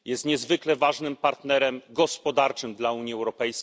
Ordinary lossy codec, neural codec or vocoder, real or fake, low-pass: none; none; real; none